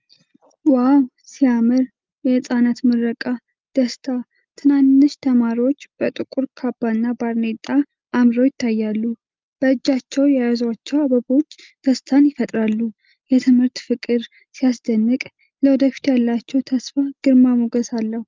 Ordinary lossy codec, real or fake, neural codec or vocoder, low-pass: Opus, 24 kbps; real; none; 7.2 kHz